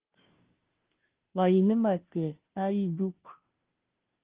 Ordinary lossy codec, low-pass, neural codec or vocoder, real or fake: Opus, 16 kbps; 3.6 kHz; codec, 16 kHz, 0.5 kbps, FunCodec, trained on Chinese and English, 25 frames a second; fake